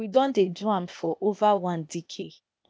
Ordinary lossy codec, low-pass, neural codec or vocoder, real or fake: none; none; codec, 16 kHz, 0.8 kbps, ZipCodec; fake